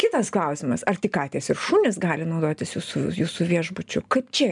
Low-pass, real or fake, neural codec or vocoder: 10.8 kHz; real; none